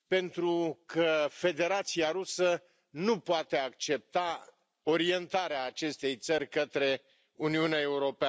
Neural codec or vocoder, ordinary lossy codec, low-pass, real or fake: none; none; none; real